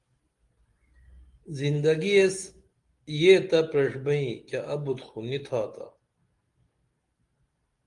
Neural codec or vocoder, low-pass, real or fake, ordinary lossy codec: none; 10.8 kHz; real; Opus, 24 kbps